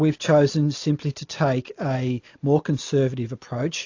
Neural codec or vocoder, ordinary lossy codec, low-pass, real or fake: none; AAC, 48 kbps; 7.2 kHz; real